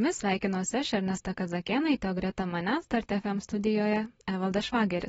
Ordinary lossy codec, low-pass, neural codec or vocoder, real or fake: AAC, 24 kbps; 19.8 kHz; none; real